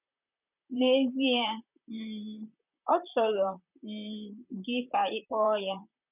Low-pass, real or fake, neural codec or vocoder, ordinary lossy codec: 3.6 kHz; fake; vocoder, 44.1 kHz, 128 mel bands, Pupu-Vocoder; none